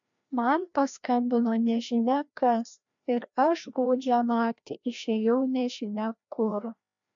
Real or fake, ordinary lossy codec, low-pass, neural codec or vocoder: fake; MP3, 64 kbps; 7.2 kHz; codec, 16 kHz, 1 kbps, FreqCodec, larger model